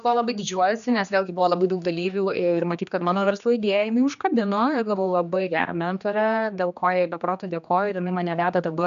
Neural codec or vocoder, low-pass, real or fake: codec, 16 kHz, 2 kbps, X-Codec, HuBERT features, trained on general audio; 7.2 kHz; fake